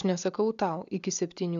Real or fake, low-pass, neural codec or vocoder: real; 7.2 kHz; none